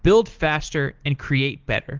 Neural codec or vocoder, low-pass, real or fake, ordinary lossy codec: none; 7.2 kHz; real; Opus, 16 kbps